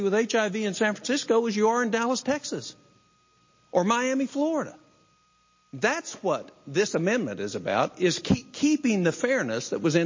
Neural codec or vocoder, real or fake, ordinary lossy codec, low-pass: none; real; MP3, 32 kbps; 7.2 kHz